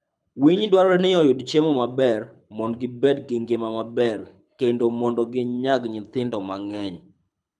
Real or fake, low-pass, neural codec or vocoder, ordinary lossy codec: fake; none; codec, 24 kHz, 6 kbps, HILCodec; none